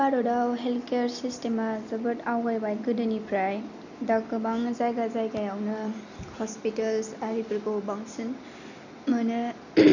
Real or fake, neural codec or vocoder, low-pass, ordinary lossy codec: real; none; 7.2 kHz; none